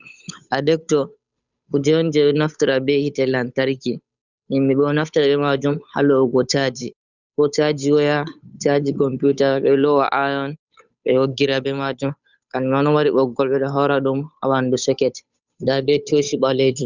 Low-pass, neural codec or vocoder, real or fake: 7.2 kHz; codec, 16 kHz, 8 kbps, FunCodec, trained on Chinese and English, 25 frames a second; fake